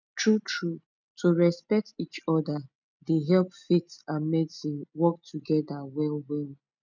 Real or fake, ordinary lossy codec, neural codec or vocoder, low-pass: real; none; none; 7.2 kHz